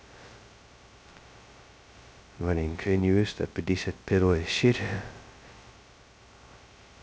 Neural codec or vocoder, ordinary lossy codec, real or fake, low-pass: codec, 16 kHz, 0.2 kbps, FocalCodec; none; fake; none